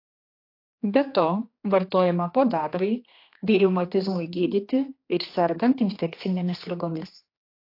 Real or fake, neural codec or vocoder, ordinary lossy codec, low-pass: fake; codec, 16 kHz, 2 kbps, X-Codec, HuBERT features, trained on general audio; AAC, 32 kbps; 5.4 kHz